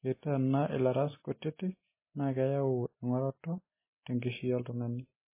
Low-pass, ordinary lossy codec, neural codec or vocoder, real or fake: 3.6 kHz; MP3, 16 kbps; none; real